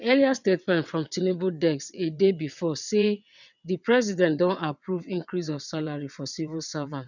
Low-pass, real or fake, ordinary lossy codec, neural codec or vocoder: 7.2 kHz; fake; none; vocoder, 22.05 kHz, 80 mel bands, WaveNeXt